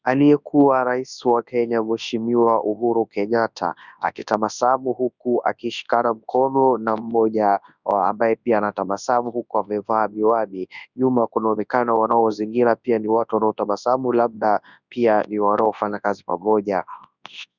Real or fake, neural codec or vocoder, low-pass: fake; codec, 24 kHz, 0.9 kbps, WavTokenizer, large speech release; 7.2 kHz